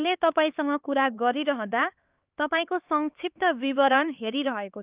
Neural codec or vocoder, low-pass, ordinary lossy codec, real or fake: codec, 16 kHz, 4 kbps, X-Codec, HuBERT features, trained on LibriSpeech; 3.6 kHz; Opus, 32 kbps; fake